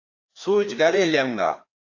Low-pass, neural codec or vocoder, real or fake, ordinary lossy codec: 7.2 kHz; codec, 16 kHz, 2 kbps, FreqCodec, larger model; fake; AAC, 48 kbps